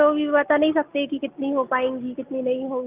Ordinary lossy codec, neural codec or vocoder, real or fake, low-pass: Opus, 24 kbps; none; real; 3.6 kHz